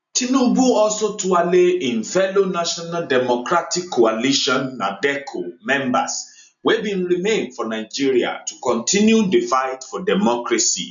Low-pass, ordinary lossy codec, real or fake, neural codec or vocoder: 7.2 kHz; none; real; none